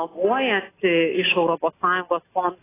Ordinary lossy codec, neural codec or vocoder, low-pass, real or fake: AAC, 16 kbps; none; 3.6 kHz; real